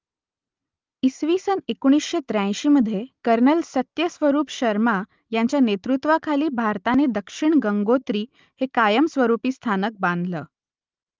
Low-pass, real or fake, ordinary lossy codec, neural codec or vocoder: 7.2 kHz; real; Opus, 32 kbps; none